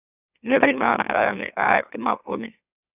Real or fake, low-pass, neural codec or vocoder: fake; 3.6 kHz; autoencoder, 44.1 kHz, a latent of 192 numbers a frame, MeloTTS